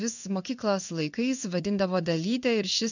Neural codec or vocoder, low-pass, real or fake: codec, 16 kHz in and 24 kHz out, 1 kbps, XY-Tokenizer; 7.2 kHz; fake